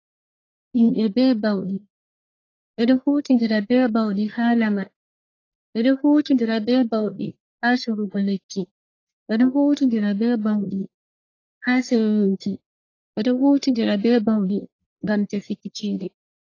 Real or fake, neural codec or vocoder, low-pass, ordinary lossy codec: fake; codec, 44.1 kHz, 1.7 kbps, Pupu-Codec; 7.2 kHz; AAC, 32 kbps